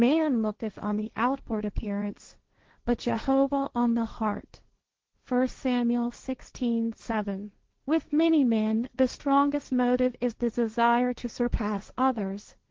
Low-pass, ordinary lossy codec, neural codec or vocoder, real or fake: 7.2 kHz; Opus, 16 kbps; codec, 16 kHz, 1.1 kbps, Voila-Tokenizer; fake